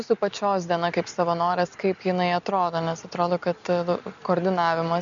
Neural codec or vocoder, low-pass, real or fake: none; 7.2 kHz; real